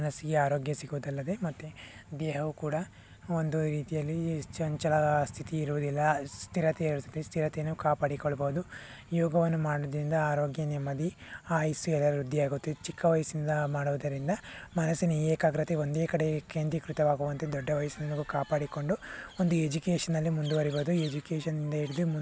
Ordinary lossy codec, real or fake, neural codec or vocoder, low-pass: none; real; none; none